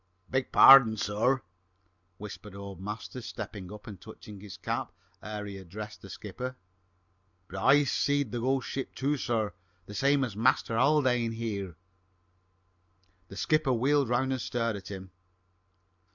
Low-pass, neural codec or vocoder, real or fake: 7.2 kHz; none; real